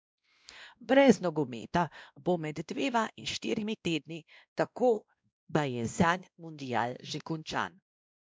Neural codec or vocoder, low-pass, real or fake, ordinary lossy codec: codec, 16 kHz, 1 kbps, X-Codec, WavLM features, trained on Multilingual LibriSpeech; none; fake; none